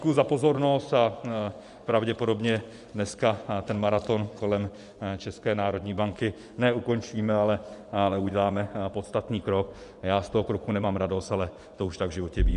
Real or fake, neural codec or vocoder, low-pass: fake; vocoder, 24 kHz, 100 mel bands, Vocos; 10.8 kHz